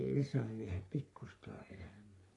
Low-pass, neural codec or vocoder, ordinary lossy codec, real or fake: 10.8 kHz; codec, 44.1 kHz, 3.4 kbps, Pupu-Codec; AAC, 48 kbps; fake